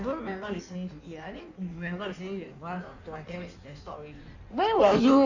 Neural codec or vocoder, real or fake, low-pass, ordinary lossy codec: codec, 16 kHz in and 24 kHz out, 1.1 kbps, FireRedTTS-2 codec; fake; 7.2 kHz; none